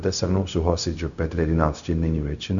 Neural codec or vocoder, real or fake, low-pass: codec, 16 kHz, 0.4 kbps, LongCat-Audio-Codec; fake; 7.2 kHz